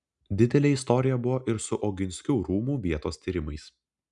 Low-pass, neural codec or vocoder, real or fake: 10.8 kHz; none; real